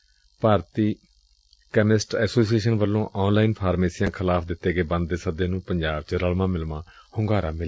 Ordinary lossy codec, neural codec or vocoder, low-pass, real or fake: none; none; none; real